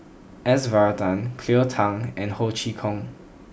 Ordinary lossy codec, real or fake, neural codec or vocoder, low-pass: none; real; none; none